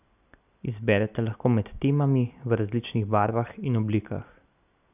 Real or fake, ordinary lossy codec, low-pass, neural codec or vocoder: real; none; 3.6 kHz; none